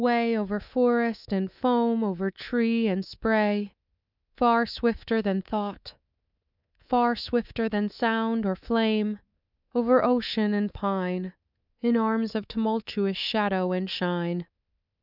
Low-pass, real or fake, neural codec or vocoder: 5.4 kHz; fake; codec, 24 kHz, 3.1 kbps, DualCodec